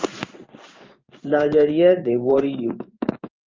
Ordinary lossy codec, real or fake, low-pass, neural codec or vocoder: Opus, 24 kbps; fake; 7.2 kHz; codec, 16 kHz in and 24 kHz out, 1 kbps, XY-Tokenizer